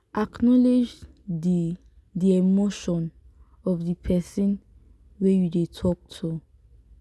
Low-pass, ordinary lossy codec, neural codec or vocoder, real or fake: none; none; none; real